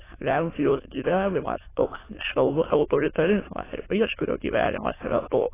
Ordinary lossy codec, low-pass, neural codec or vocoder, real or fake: AAC, 16 kbps; 3.6 kHz; autoencoder, 22.05 kHz, a latent of 192 numbers a frame, VITS, trained on many speakers; fake